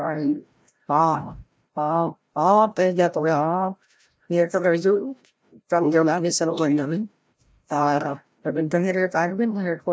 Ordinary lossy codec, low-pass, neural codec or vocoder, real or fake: none; none; codec, 16 kHz, 0.5 kbps, FreqCodec, larger model; fake